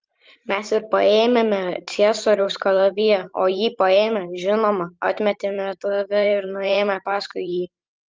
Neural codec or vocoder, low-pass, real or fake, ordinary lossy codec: vocoder, 44.1 kHz, 128 mel bands every 512 samples, BigVGAN v2; 7.2 kHz; fake; Opus, 32 kbps